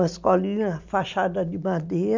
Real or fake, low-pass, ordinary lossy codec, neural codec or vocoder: real; 7.2 kHz; MP3, 64 kbps; none